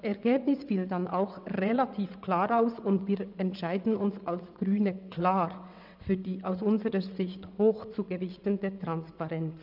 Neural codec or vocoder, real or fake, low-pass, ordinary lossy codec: vocoder, 22.05 kHz, 80 mel bands, WaveNeXt; fake; 5.4 kHz; none